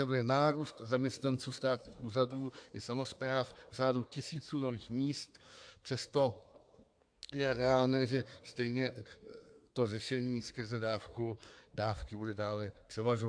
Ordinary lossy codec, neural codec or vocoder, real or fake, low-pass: AAC, 64 kbps; codec, 24 kHz, 1 kbps, SNAC; fake; 9.9 kHz